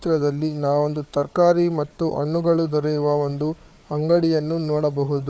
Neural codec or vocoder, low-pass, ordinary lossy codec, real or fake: codec, 16 kHz, 4 kbps, FunCodec, trained on Chinese and English, 50 frames a second; none; none; fake